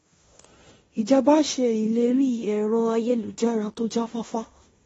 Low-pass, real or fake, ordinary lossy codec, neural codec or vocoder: 10.8 kHz; fake; AAC, 24 kbps; codec, 16 kHz in and 24 kHz out, 0.9 kbps, LongCat-Audio-Codec, fine tuned four codebook decoder